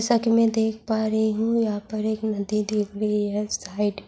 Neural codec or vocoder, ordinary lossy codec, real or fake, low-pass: none; none; real; none